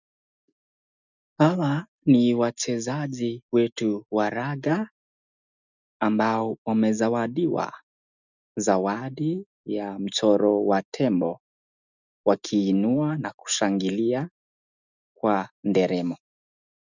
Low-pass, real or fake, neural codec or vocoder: 7.2 kHz; real; none